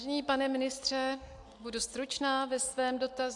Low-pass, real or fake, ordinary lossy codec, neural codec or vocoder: 10.8 kHz; real; AAC, 64 kbps; none